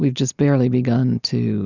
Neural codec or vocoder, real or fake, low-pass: none; real; 7.2 kHz